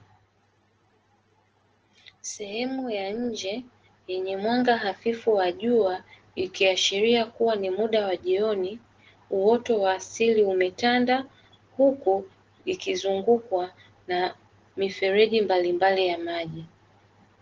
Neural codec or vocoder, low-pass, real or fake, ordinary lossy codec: none; 7.2 kHz; real; Opus, 16 kbps